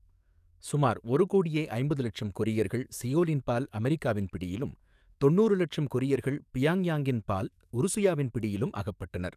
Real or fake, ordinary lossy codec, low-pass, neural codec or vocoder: fake; none; 14.4 kHz; codec, 44.1 kHz, 7.8 kbps, DAC